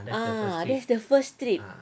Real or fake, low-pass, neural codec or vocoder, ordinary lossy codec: real; none; none; none